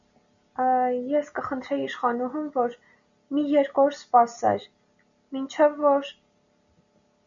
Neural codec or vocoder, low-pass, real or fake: none; 7.2 kHz; real